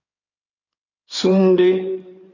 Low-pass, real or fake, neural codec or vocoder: 7.2 kHz; fake; codec, 16 kHz in and 24 kHz out, 2.2 kbps, FireRedTTS-2 codec